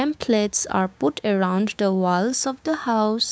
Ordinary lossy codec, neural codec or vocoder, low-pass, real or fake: none; codec, 16 kHz, 6 kbps, DAC; none; fake